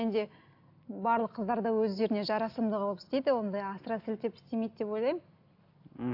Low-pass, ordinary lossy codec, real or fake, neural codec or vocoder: 5.4 kHz; AAC, 48 kbps; real; none